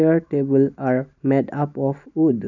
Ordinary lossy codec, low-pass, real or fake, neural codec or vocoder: none; 7.2 kHz; real; none